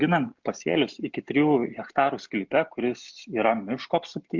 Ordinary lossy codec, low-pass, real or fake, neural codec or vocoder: MP3, 64 kbps; 7.2 kHz; real; none